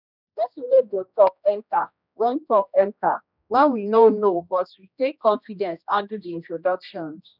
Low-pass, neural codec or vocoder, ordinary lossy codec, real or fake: 5.4 kHz; codec, 16 kHz, 1 kbps, X-Codec, HuBERT features, trained on general audio; none; fake